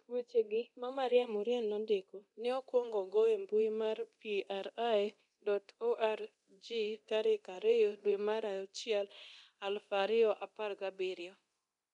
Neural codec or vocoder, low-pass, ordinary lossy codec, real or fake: codec, 24 kHz, 0.9 kbps, DualCodec; 10.8 kHz; none; fake